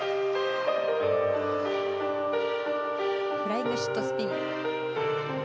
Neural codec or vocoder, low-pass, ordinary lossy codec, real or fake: none; none; none; real